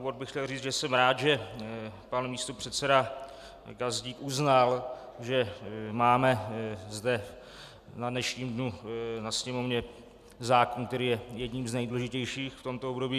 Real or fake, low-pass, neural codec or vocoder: real; 14.4 kHz; none